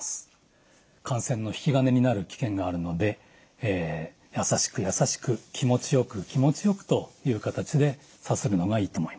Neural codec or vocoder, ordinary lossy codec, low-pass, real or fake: none; none; none; real